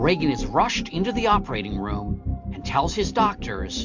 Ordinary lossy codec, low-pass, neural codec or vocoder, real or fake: MP3, 64 kbps; 7.2 kHz; none; real